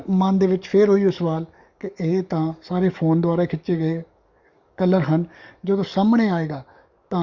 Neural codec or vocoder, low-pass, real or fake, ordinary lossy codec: codec, 44.1 kHz, 7.8 kbps, DAC; 7.2 kHz; fake; Opus, 64 kbps